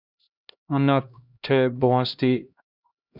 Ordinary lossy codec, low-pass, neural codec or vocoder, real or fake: Opus, 64 kbps; 5.4 kHz; codec, 16 kHz, 1 kbps, X-Codec, HuBERT features, trained on LibriSpeech; fake